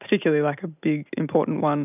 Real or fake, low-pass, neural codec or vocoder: real; 3.6 kHz; none